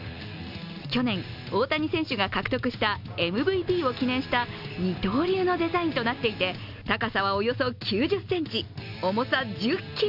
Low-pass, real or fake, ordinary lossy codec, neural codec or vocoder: 5.4 kHz; real; none; none